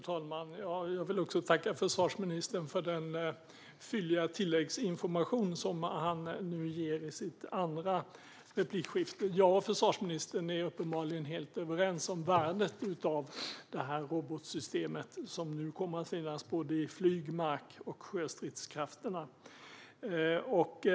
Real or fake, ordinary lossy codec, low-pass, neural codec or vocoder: real; none; none; none